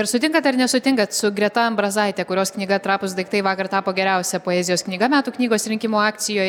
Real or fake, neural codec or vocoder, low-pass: real; none; 19.8 kHz